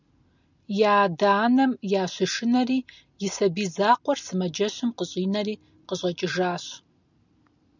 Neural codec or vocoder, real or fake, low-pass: none; real; 7.2 kHz